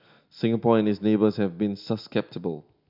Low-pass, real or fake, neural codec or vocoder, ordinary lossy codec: 5.4 kHz; real; none; none